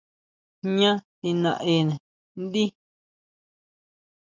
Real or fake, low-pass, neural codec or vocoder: real; 7.2 kHz; none